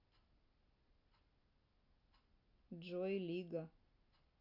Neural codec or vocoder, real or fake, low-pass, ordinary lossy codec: none; real; 5.4 kHz; none